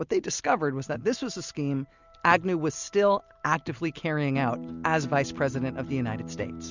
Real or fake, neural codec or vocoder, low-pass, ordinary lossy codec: real; none; 7.2 kHz; Opus, 64 kbps